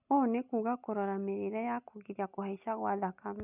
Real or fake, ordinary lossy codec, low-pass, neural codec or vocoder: real; none; 3.6 kHz; none